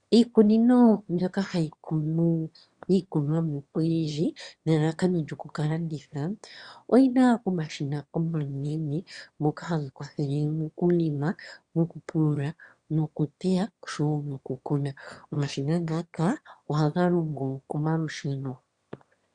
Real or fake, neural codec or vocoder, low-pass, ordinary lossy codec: fake; autoencoder, 22.05 kHz, a latent of 192 numbers a frame, VITS, trained on one speaker; 9.9 kHz; Opus, 64 kbps